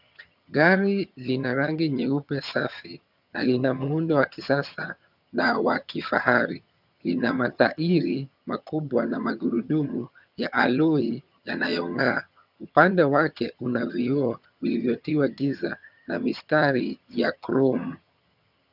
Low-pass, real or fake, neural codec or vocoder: 5.4 kHz; fake; vocoder, 22.05 kHz, 80 mel bands, HiFi-GAN